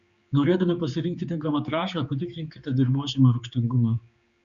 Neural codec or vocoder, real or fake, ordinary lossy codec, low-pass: codec, 16 kHz, 4 kbps, X-Codec, HuBERT features, trained on general audio; fake; Opus, 64 kbps; 7.2 kHz